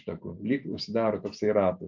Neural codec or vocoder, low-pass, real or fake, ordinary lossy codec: none; 7.2 kHz; real; MP3, 64 kbps